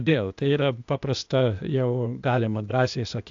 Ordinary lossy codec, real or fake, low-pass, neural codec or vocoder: AAC, 64 kbps; fake; 7.2 kHz; codec, 16 kHz, 0.8 kbps, ZipCodec